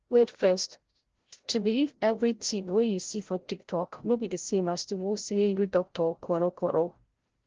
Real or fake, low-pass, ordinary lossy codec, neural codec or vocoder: fake; 7.2 kHz; Opus, 16 kbps; codec, 16 kHz, 0.5 kbps, FreqCodec, larger model